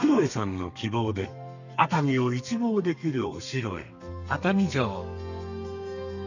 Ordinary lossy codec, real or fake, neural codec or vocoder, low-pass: none; fake; codec, 32 kHz, 1.9 kbps, SNAC; 7.2 kHz